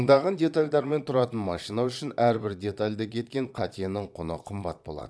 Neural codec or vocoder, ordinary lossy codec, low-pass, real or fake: vocoder, 22.05 kHz, 80 mel bands, Vocos; none; none; fake